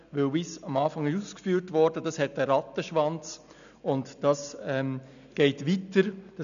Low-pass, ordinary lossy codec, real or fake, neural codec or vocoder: 7.2 kHz; none; real; none